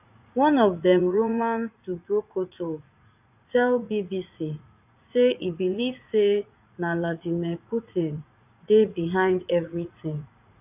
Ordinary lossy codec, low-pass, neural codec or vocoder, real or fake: AAC, 32 kbps; 3.6 kHz; vocoder, 44.1 kHz, 80 mel bands, Vocos; fake